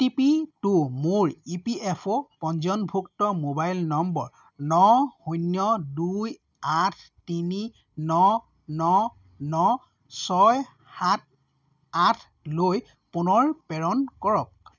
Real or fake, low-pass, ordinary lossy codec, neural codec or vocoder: real; 7.2 kHz; none; none